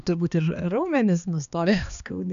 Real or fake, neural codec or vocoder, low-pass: fake; codec, 16 kHz, 2 kbps, X-Codec, HuBERT features, trained on balanced general audio; 7.2 kHz